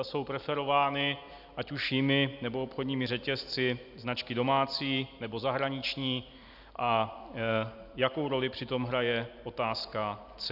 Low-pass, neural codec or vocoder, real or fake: 5.4 kHz; none; real